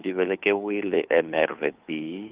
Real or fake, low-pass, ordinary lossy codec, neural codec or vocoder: fake; 3.6 kHz; Opus, 32 kbps; codec, 16 kHz, 2 kbps, FunCodec, trained on Chinese and English, 25 frames a second